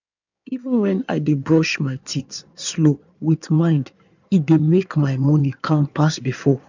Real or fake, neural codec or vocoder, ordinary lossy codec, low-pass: fake; codec, 16 kHz in and 24 kHz out, 2.2 kbps, FireRedTTS-2 codec; none; 7.2 kHz